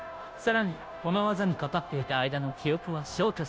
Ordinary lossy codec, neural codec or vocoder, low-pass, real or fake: none; codec, 16 kHz, 0.5 kbps, FunCodec, trained on Chinese and English, 25 frames a second; none; fake